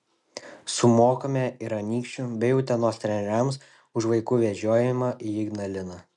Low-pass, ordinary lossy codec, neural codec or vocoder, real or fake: 10.8 kHz; MP3, 96 kbps; none; real